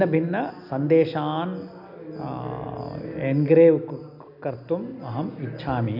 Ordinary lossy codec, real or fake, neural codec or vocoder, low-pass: none; real; none; 5.4 kHz